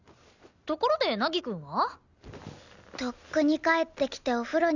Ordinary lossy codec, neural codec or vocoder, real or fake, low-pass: none; none; real; 7.2 kHz